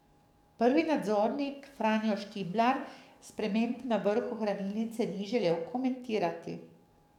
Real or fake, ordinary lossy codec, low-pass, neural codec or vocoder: fake; none; 19.8 kHz; codec, 44.1 kHz, 7.8 kbps, DAC